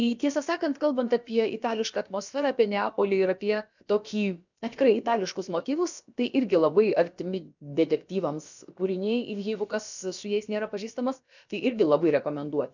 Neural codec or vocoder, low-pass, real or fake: codec, 16 kHz, about 1 kbps, DyCAST, with the encoder's durations; 7.2 kHz; fake